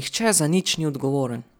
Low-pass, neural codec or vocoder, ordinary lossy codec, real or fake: none; none; none; real